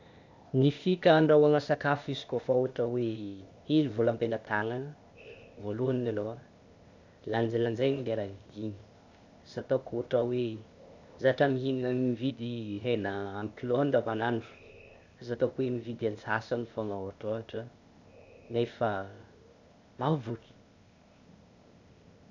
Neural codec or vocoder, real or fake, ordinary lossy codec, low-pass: codec, 16 kHz, 0.8 kbps, ZipCodec; fake; none; 7.2 kHz